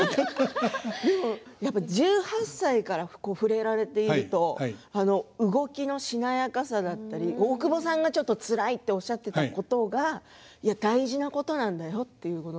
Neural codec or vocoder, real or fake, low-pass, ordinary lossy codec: none; real; none; none